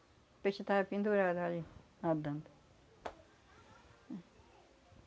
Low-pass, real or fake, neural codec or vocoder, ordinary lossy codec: none; real; none; none